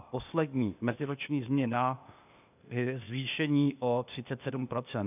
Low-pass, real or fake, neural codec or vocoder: 3.6 kHz; fake; codec, 16 kHz, 0.8 kbps, ZipCodec